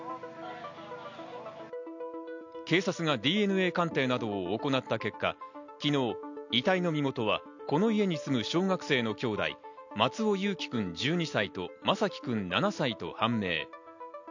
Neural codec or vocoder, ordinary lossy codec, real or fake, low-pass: none; none; real; 7.2 kHz